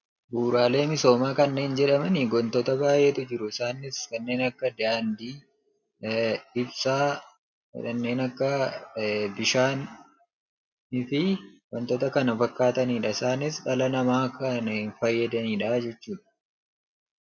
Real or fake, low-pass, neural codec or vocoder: real; 7.2 kHz; none